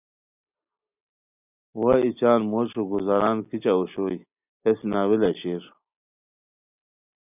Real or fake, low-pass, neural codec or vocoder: real; 3.6 kHz; none